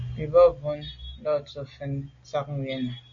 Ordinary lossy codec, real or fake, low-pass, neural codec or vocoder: MP3, 48 kbps; real; 7.2 kHz; none